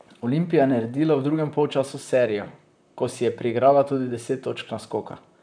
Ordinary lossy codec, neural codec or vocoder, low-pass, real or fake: none; vocoder, 44.1 kHz, 128 mel bands, Pupu-Vocoder; 9.9 kHz; fake